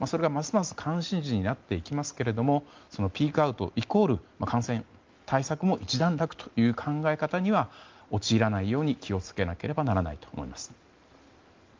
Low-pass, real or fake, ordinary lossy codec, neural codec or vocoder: 7.2 kHz; real; Opus, 32 kbps; none